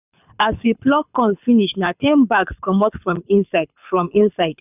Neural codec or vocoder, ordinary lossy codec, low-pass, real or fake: codec, 24 kHz, 6 kbps, HILCodec; none; 3.6 kHz; fake